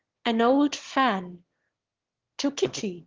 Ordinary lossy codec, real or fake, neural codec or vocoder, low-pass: Opus, 16 kbps; fake; autoencoder, 22.05 kHz, a latent of 192 numbers a frame, VITS, trained on one speaker; 7.2 kHz